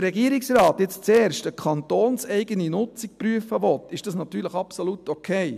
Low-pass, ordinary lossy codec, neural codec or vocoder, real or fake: 14.4 kHz; MP3, 96 kbps; none; real